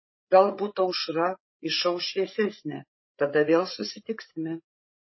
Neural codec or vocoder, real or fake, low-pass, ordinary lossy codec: codec, 16 kHz, 8 kbps, FreqCodec, larger model; fake; 7.2 kHz; MP3, 24 kbps